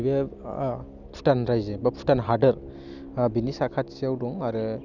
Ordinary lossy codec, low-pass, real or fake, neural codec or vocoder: none; 7.2 kHz; real; none